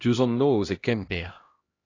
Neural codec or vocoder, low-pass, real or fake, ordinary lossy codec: codec, 16 kHz, 1 kbps, X-Codec, HuBERT features, trained on LibriSpeech; 7.2 kHz; fake; AAC, 32 kbps